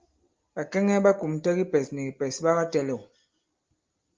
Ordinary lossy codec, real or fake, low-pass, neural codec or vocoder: Opus, 24 kbps; real; 7.2 kHz; none